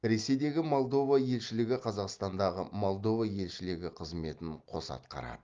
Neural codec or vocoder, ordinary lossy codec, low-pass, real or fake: none; Opus, 32 kbps; 7.2 kHz; real